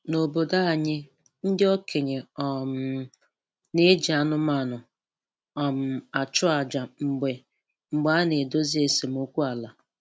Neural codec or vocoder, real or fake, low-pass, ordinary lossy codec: none; real; none; none